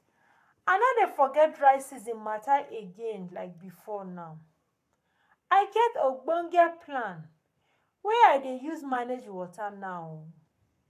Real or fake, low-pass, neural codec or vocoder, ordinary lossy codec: fake; 14.4 kHz; codec, 44.1 kHz, 7.8 kbps, Pupu-Codec; AAC, 96 kbps